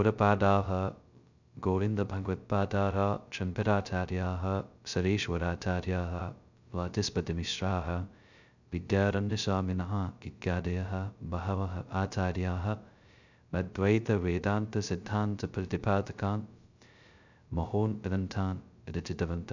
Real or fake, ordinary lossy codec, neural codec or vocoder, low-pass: fake; none; codec, 16 kHz, 0.2 kbps, FocalCodec; 7.2 kHz